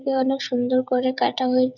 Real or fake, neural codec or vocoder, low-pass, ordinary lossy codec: fake; codec, 16 kHz, 16 kbps, FreqCodec, smaller model; 7.2 kHz; none